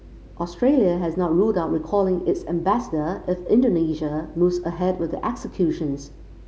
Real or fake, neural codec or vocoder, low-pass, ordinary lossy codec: real; none; none; none